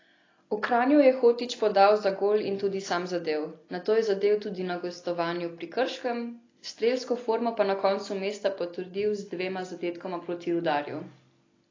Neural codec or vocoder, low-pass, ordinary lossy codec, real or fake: none; 7.2 kHz; AAC, 32 kbps; real